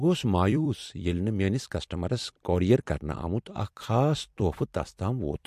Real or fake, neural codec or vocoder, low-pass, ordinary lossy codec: fake; vocoder, 44.1 kHz, 128 mel bands every 256 samples, BigVGAN v2; 14.4 kHz; MP3, 64 kbps